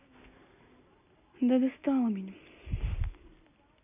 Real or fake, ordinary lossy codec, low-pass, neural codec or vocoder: real; none; 3.6 kHz; none